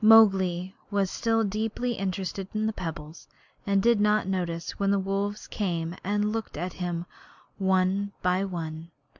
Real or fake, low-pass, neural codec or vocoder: real; 7.2 kHz; none